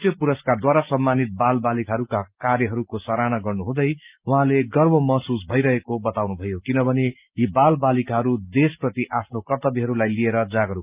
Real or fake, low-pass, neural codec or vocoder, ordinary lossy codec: real; 3.6 kHz; none; Opus, 24 kbps